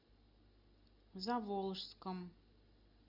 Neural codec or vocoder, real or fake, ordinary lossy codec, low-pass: none; real; Opus, 64 kbps; 5.4 kHz